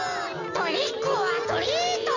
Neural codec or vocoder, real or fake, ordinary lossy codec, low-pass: none; real; none; 7.2 kHz